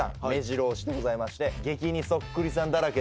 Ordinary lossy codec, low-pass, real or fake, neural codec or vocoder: none; none; real; none